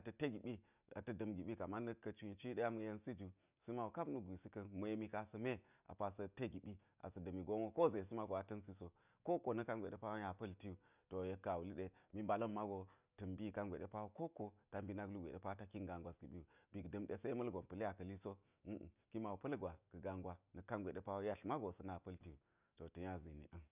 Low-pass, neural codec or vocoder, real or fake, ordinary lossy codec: 3.6 kHz; none; real; none